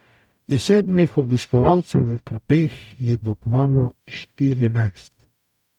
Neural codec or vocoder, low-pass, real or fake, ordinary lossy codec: codec, 44.1 kHz, 0.9 kbps, DAC; 19.8 kHz; fake; none